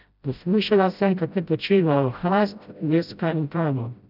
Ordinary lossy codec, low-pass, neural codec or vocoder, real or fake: none; 5.4 kHz; codec, 16 kHz, 0.5 kbps, FreqCodec, smaller model; fake